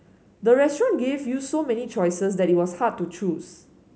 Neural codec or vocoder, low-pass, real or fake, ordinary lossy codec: none; none; real; none